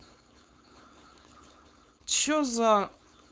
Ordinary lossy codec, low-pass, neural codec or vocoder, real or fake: none; none; codec, 16 kHz, 4.8 kbps, FACodec; fake